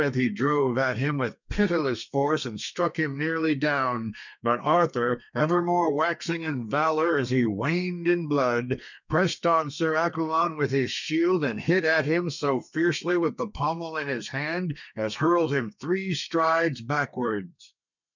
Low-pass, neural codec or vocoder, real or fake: 7.2 kHz; codec, 32 kHz, 1.9 kbps, SNAC; fake